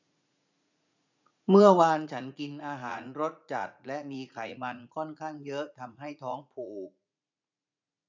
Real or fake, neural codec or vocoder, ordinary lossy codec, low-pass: fake; vocoder, 44.1 kHz, 80 mel bands, Vocos; none; 7.2 kHz